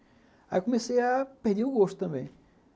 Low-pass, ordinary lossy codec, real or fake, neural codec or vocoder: none; none; real; none